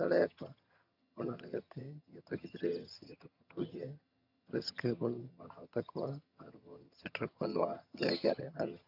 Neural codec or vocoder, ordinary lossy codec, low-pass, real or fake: vocoder, 22.05 kHz, 80 mel bands, HiFi-GAN; none; 5.4 kHz; fake